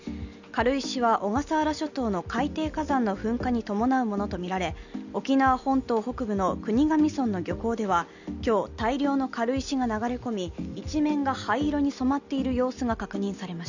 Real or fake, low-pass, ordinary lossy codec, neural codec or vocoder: real; 7.2 kHz; none; none